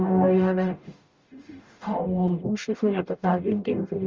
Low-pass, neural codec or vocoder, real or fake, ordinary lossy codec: 7.2 kHz; codec, 44.1 kHz, 0.9 kbps, DAC; fake; Opus, 32 kbps